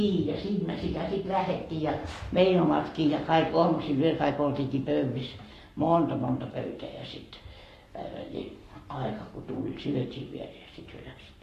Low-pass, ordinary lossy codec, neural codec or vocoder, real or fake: 14.4 kHz; AAC, 48 kbps; codec, 44.1 kHz, 7.8 kbps, Pupu-Codec; fake